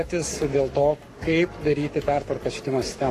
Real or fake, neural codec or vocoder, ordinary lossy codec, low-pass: fake; codec, 44.1 kHz, 7.8 kbps, Pupu-Codec; AAC, 48 kbps; 14.4 kHz